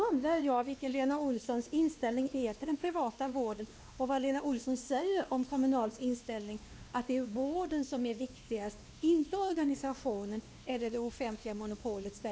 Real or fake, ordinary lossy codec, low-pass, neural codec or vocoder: fake; none; none; codec, 16 kHz, 2 kbps, X-Codec, WavLM features, trained on Multilingual LibriSpeech